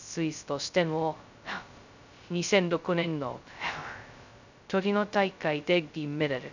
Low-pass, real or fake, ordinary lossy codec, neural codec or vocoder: 7.2 kHz; fake; none; codec, 16 kHz, 0.2 kbps, FocalCodec